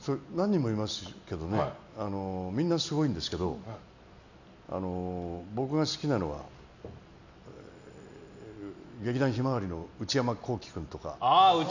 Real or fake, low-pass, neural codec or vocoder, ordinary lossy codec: real; 7.2 kHz; none; none